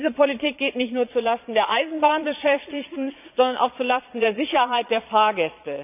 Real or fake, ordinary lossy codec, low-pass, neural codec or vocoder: fake; none; 3.6 kHz; vocoder, 22.05 kHz, 80 mel bands, Vocos